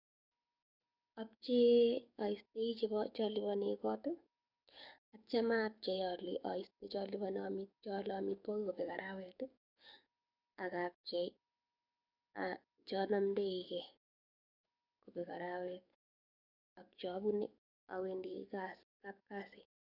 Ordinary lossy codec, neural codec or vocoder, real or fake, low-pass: none; codec, 44.1 kHz, 7.8 kbps, DAC; fake; 5.4 kHz